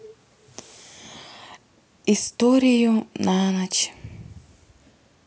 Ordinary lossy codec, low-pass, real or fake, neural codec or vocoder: none; none; real; none